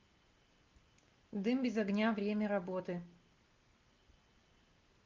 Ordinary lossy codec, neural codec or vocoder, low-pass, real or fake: Opus, 24 kbps; none; 7.2 kHz; real